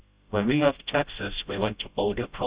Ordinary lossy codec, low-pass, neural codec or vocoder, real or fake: Opus, 64 kbps; 3.6 kHz; codec, 16 kHz, 0.5 kbps, FreqCodec, smaller model; fake